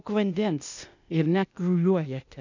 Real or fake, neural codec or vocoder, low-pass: fake; codec, 16 kHz, 0.8 kbps, ZipCodec; 7.2 kHz